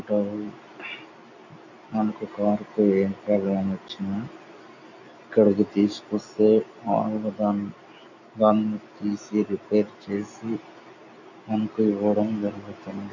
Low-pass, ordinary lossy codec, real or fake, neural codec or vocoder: 7.2 kHz; none; real; none